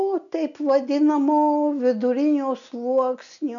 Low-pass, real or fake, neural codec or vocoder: 7.2 kHz; real; none